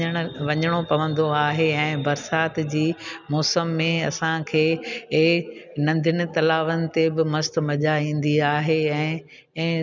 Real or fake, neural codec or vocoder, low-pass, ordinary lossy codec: real; none; 7.2 kHz; none